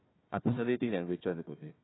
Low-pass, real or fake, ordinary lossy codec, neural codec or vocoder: 7.2 kHz; fake; AAC, 16 kbps; codec, 16 kHz, 1 kbps, FunCodec, trained on Chinese and English, 50 frames a second